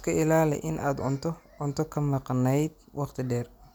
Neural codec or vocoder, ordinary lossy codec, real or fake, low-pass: none; none; real; none